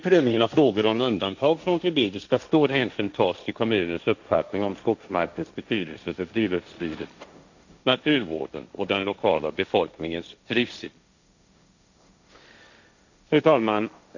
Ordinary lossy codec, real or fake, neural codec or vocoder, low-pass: none; fake; codec, 16 kHz, 1.1 kbps, Voila-Tokenizer; 7.2 kHz